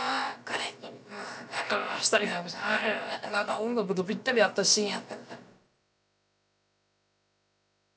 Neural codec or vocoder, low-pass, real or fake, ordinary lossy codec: codec, 16 kHz, about 1 kbps, DyCAST, with the encoder's durations; none; fake; none